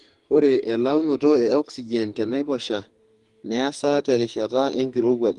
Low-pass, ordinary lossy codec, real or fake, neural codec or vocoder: 10.8 kHz; Opus, 24 kbps; fake; codec, 44.1 kHz, 2.6 kbps, SNAC